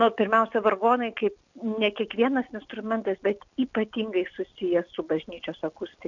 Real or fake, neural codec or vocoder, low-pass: real; none; 7.2 kHz